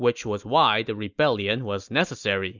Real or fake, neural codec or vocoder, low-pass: real; none; 7.2 kHz